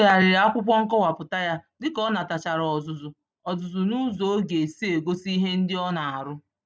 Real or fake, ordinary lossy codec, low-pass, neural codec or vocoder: real; none; none; none